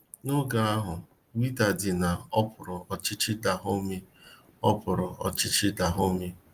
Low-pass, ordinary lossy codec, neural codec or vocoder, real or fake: 14.4 kHz; Opus, 32 kbps; none; real